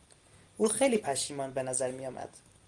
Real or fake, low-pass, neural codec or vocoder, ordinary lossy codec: real; 10.8 kHz; none; Opus, 24 kbps